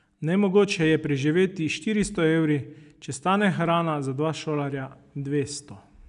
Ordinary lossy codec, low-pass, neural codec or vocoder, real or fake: none; 10.8 kHz; none; real